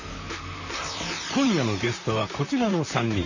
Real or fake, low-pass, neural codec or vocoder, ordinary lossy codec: fake; 7.2 kHz; vocoder, 44.1 kHz, 128 mel bands, Pupu-Vocoder; none